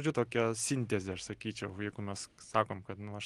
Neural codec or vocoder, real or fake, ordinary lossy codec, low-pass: none; real; Opus, 24 kbps; 10.8 kHz